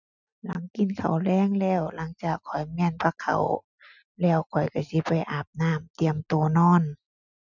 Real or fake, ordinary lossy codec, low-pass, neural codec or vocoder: real; none; 7.2 kHz; none